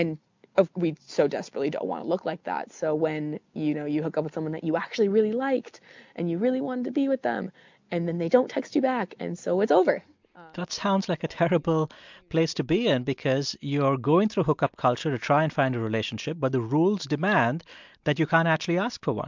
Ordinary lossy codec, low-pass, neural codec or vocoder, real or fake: MP3, 64 kbps; 7.2 kHz; none; real